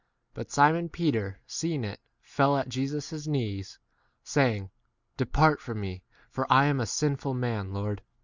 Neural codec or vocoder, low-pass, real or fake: none; 7.2 kHz; real